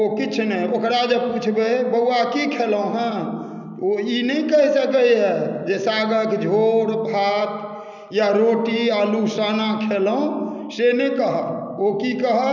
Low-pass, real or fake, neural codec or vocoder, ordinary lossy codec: 7.2 kHz; real; none; none